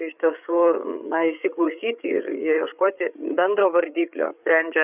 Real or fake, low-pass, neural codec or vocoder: fake; 3.6 kHz; codec, 16 kHz, 8 kbps, FreqCodec, larger model